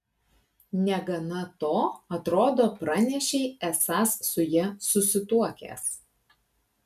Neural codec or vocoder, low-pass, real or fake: none; 14.4 kHz; real